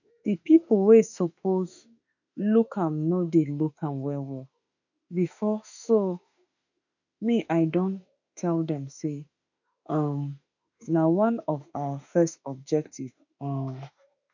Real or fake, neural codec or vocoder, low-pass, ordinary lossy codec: fake; autoencoder, 48 kHz, 32 numbers a frame, DAC-VAE, trained on Japanese speech; 7.2 kHz; none